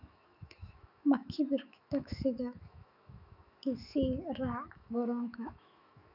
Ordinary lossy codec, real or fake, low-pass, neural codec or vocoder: none; fake; 5.4 kHz; autoencoder, 48 kHz, 128 numbers a frame, DAC-VAE, trained on Japanese speech